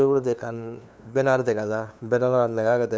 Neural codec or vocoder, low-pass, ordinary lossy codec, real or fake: codec, 16 kHz, 2 kbps, FunCodec, trained on LibriTTS, 25 frames a second; none; none; fake